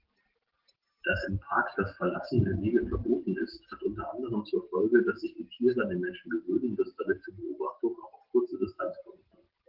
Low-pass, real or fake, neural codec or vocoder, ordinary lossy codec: 5.4 kHz; real; none; Opus, 16 kbps